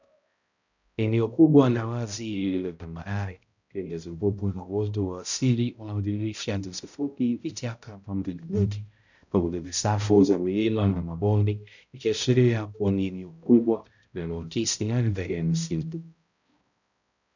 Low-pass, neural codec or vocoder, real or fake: 7.2 kHz; codec, 16 kHz, 0.5 kbps, X-Codec, HuBERT features, trained on balanced general audio; fake